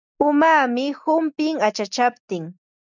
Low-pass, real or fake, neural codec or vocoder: 7.2 kHz; real; none